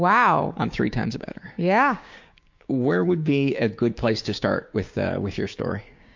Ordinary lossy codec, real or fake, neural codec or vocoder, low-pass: MP3, 48 kbps; fake; codec, 16 kHz, 6 kbps, DAC; 7.2 kHz